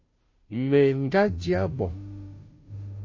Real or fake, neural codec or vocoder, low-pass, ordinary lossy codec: fake; codec, 16 kHz, 0.5 kbps, FunCodec, trained on Chinese and English, 25 frames a second; 7.2 kHz; MP3, 32 kbps